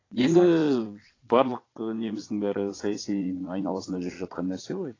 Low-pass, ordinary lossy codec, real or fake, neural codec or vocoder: 7.2 kHz; AAC, 32 kbps; fake; vocoder, 22.05 kHz, 80 mel bands, Vocos